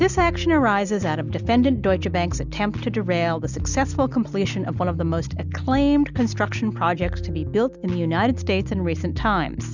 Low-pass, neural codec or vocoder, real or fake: 7.2 kHz; none; real